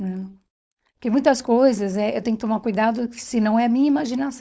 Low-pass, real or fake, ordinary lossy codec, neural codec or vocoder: none; fake; none; codec, 16 kHz, 4.8 kbps, FACodec